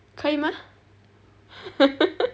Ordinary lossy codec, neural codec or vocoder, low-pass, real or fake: none; none; none; real